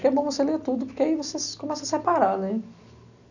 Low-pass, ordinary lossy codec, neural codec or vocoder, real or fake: 7.2 kHz; none; none; real